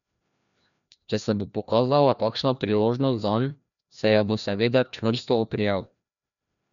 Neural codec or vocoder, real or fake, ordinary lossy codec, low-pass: codec, 16 kHz, 1 kbps, FreqCodec, larger model; fake; none; 7.2 kHz